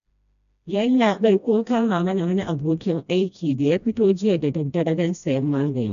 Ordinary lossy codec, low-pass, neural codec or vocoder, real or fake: none; 7.2 kHz; codec, 16 kHz, 1 kbps, FreqCodec, smaller model; fake